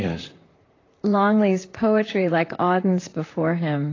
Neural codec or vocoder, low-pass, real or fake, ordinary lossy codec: vocoder, 44.1 kHz, 128 mel bands, Pupu-Vocoder; 7.2 kHz; fake; AAC, 32 kbps